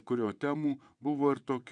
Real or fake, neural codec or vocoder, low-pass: fake; vocoder, 22.05 kHz, 80 mel bands, WaveNeXt; 9.9 kHz